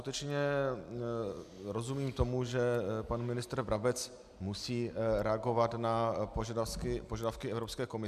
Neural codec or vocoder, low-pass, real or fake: none; 14.4 kHz; real